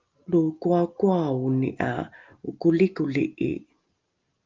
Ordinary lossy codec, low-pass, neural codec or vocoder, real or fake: Opus, 32 kbps; 7.2 kHz; none; real